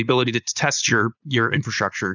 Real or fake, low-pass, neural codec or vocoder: fake; 7.2 kHz; vocoder, 44.1 kHz, 80 mel bands, Vocos